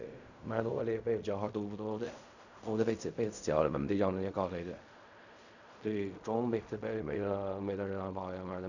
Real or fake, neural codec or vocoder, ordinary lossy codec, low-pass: fake; codec, 16 kHz in and 24 kHz out, 0.4 kbps, LongCat-Audio-Codec, fine tuned four codebook decoder; none; 7.2 kHz